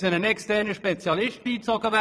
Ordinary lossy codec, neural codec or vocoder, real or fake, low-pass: none; vocoder, 22.05 kHz, 80 mel bands, Vocos; fake; none